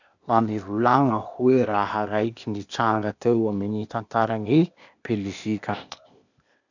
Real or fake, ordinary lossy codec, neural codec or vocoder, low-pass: fake; none; codec, 16 kHz, 0.8 kbps, ZipCodec; 7.2 kHz